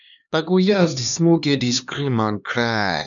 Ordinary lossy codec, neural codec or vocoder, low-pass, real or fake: none; codec, 16 kHz, 2 kbps, X-Codec, HuBERT features, trained on LibriSpeech; 7.2 kHz; fake